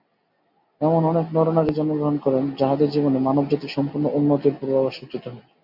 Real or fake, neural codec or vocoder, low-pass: real; none; 5.4 kHz